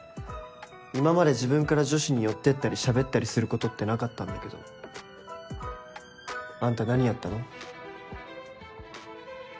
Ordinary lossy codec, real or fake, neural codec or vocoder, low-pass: none; real; none; none